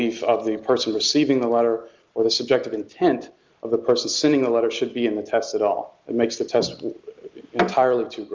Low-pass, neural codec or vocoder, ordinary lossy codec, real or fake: 7.2 kHz; none; Opus, 32 kbps; real